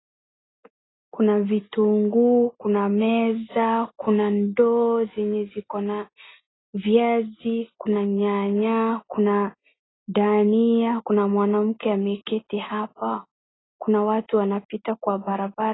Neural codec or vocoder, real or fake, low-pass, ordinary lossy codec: none; real; 7.2 kHz; AAC, 16 kbps